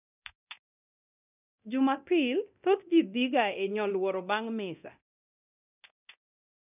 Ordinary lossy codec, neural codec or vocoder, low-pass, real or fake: none; codec, 24 kHz, 0.9 kbps, DualCodec; 3.6 kHz; fake